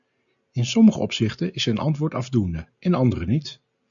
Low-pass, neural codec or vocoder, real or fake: 7.2 kHz; none; real